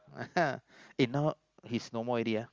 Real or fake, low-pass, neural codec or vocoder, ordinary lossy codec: real; 7.2 kHz; none; Opus, 32 kbps